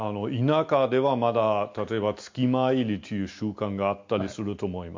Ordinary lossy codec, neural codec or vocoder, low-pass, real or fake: MP3, 48 kbps; none; 7.2 kHz; real